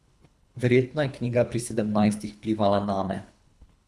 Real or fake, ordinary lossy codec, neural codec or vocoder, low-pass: fake; none; codec, 24 kHz, 3 kbps, HILCodec; none